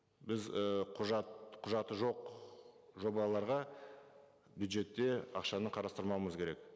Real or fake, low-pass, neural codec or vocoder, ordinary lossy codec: real; none; none; none